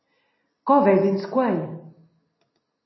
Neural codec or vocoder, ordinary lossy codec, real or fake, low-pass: none; MP3, 24 kbps; real; 7.2 kHz